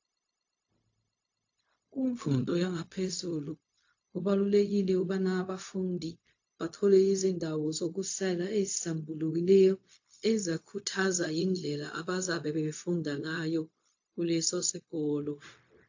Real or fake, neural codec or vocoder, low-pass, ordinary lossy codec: fake; codec, 16 kHz, 0.4 kbps, LongCat-Audio-Codec; 7.2 kHz; AAC, 48 kbps